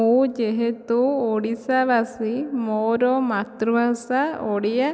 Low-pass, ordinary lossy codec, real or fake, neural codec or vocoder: none; none; real; none